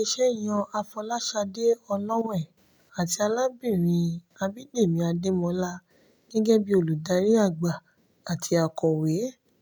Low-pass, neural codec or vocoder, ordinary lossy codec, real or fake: none; none; none; real